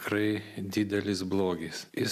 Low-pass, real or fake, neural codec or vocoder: 14.4 kHz; real; none